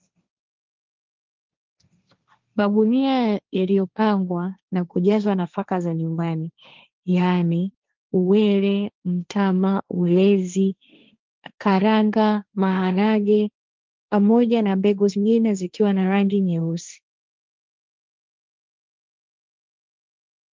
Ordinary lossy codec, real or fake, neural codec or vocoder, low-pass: Opus, 32 kbps; fake; codec, 16 kHz, 1.1 kbps, Voila-Tokenizer; 7.2 kHz